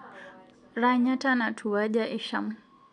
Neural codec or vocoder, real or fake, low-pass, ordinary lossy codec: none; real; 9.9 kHz; none